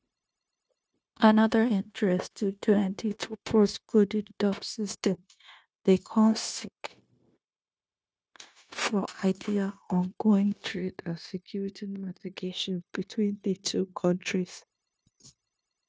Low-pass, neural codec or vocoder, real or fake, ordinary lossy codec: none; codec, 16 kHz, 0.9 kbps, LongCat-Audio-Codec; fake; none